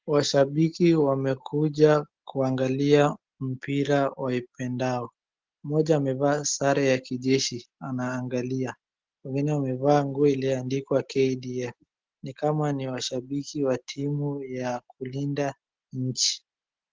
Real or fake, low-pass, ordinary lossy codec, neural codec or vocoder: real; 7.2 kHz; Opus, 16 kbps; none